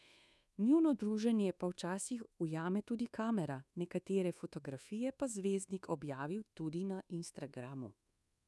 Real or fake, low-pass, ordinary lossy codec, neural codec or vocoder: fake; none; none; codec, 24 kHz, 1.2 kbps, DualCodec